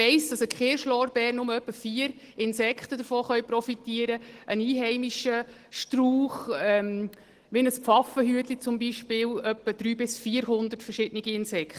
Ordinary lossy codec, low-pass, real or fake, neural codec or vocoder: Opus, 16 kbps; 14.4 kHz; real; none